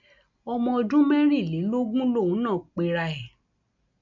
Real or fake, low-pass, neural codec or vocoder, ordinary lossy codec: real; 7.2 kHz; none; none